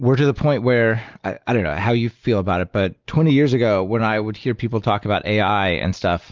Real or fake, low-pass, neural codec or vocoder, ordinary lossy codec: real; 7.2 kHz; none; Opus, 24 kbps